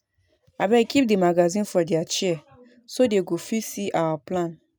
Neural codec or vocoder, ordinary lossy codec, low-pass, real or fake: none; none; none; real